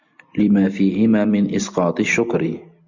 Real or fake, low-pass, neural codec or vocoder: real; 7.2 kHz; none